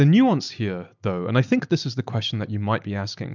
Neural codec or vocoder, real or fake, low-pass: none; real; 7.2 kHz